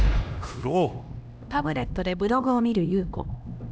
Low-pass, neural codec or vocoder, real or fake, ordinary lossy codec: none; codec, 16 kHz, 1 kbps, X-Codec, HuBERT features, trained on LibriSpeech; fake; none